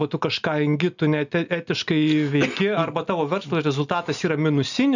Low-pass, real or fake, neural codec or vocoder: 7.2 kHz; real; none